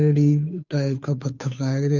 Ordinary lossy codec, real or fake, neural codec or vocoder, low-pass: none; fake; codec, 16 kHz, 2 kbps, FunCodec, trained on Chinese and English, 25 frames a second; 7.2 kHz